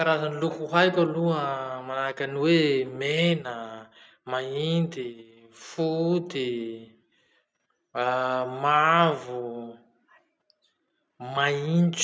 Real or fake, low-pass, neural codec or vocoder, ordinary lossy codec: real; none; none; none